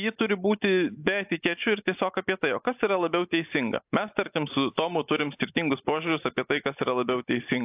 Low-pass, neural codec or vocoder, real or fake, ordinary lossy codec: 3.6 kHz; none; real; AAC, 32 kbps